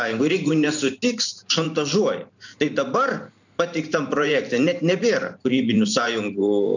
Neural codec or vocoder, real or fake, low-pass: vocoder, 44.1 kHz, 128 mel bands every 512 samples, BigVGAN v2; fake; 7.2 kHz